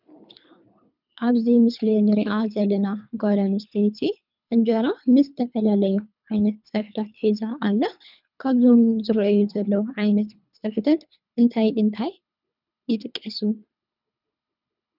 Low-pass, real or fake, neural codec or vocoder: 5.4 kHz; fake; codec, 24 kHz, 3 kbps, HILCodec